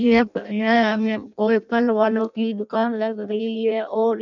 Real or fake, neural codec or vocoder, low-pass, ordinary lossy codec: fake; codec, 16 kHz in and 24 kHz out, 0.6 kbps, FireRedTTS-2 codec; 7.2 kHz; none